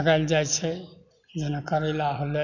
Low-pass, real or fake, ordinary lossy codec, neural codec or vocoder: 7.2 kHz; real; none; none